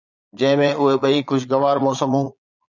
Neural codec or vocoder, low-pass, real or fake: vocoder, 22.05 kHz, 80 mel bands, Vocos; 7.2 kHz; fake